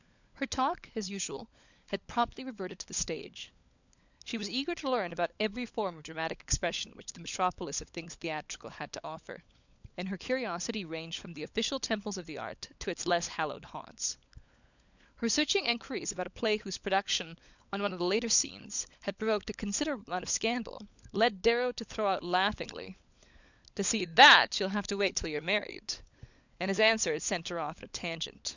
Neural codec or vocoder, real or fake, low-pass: codec, 16 kHz, 16 kbps, FunCodec, trained on LibriTTS, 50 frames a second; fake; 7.2 kHz